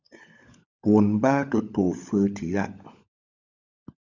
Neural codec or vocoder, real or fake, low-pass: codec, 16 kHz, 16 kbps, FunCodec, trained on LibriTTS, 50 frames a second; fake; 7.2 kHz